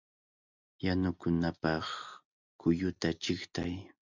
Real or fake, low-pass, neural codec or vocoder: real; 7.2 kHz; none